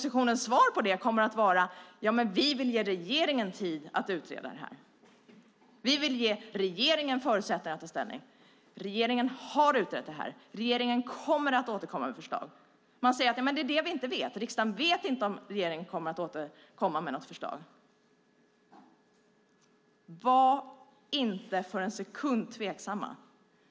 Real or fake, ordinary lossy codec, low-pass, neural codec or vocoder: real; none; none; none